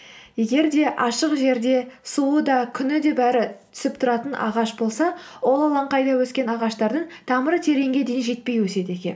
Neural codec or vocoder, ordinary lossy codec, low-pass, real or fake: none; none; none; real